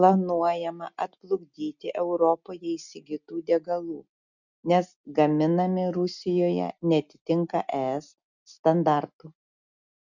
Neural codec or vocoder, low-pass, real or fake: none; 7.2 kHz; real